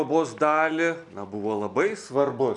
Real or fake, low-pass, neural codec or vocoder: real; 10.8 kHz; none